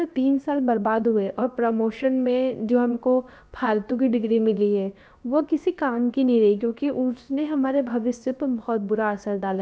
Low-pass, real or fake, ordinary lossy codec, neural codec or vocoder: none; fake; none; codec, 16 kHz, about 1 kbps, DyCAST, with the encoder's durations